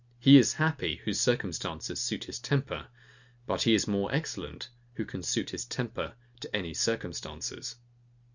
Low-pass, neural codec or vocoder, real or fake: 7.2 kHz; none; real